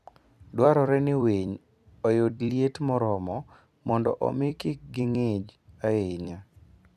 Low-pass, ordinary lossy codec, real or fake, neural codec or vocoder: 14.4 kHz; none; fake; vocoder, 44.1 kHz, 128 mel bands every 256 samples, BigVGAN v2